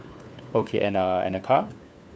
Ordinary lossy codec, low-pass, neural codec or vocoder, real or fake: none; none; codec, 16 kHz, 4 kbps, FunCodec, trained on LibriTTS, 50 frames a second; fake